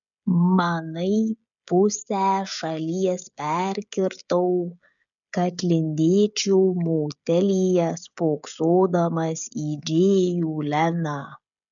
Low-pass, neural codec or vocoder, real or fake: 7.2 kHz; codec, 16 kHz, 16 kbps, FreqCodec, smaller model; fake